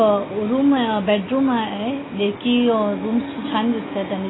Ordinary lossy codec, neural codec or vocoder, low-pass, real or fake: AAC, 16 kbps; none; 7.2 kHz; real